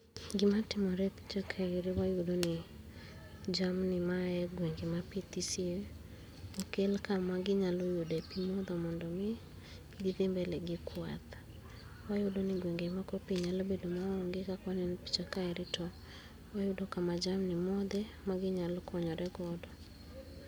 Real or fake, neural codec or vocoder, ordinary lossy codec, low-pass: real; none; none; none